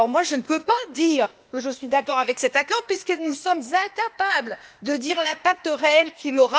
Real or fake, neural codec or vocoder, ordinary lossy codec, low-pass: fake; codec, 16 kHz, 0.8 kbps, ZipCodec; none; none